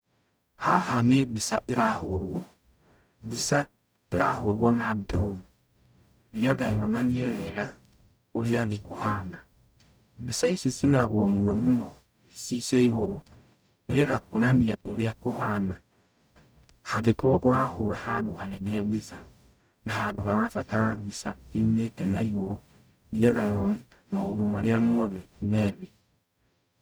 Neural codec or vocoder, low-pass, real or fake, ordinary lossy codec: codec, 44.1 kHz, 0.9 kbps, DAC; none; fake; none